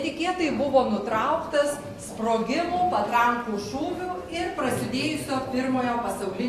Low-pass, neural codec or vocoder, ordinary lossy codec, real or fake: 14.4 kHz; none; AAC, 48 kbps; real